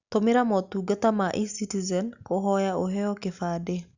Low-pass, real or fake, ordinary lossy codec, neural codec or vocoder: 7.2 kHz; real; Opus, 64 kbps; none